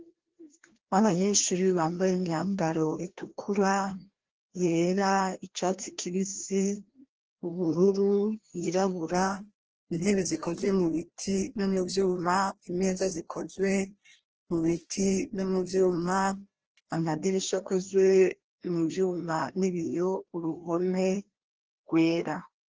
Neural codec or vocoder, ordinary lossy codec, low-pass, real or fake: codec, 16 kHz, 1 kbps, FreqCodec, larger model; Opus, 16 kbps; 7.2 kHz; fake